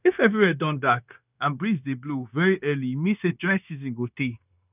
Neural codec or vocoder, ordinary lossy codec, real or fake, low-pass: codec, 16 kHz, 0.9 kbps, LongCat-Audio-Codec; none; fake; 3.6 kHz